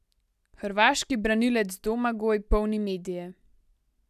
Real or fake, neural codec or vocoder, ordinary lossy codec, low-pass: real; none; none; 14.4 kHz